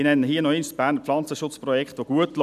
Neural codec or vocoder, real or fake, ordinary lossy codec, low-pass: none; real; none; 14.4 kHz